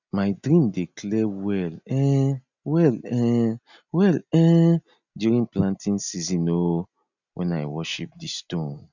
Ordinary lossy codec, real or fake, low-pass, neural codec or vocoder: none; real; 7.2 kHz; none